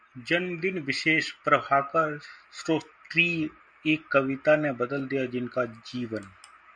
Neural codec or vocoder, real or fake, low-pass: none; real; 9.9 kHz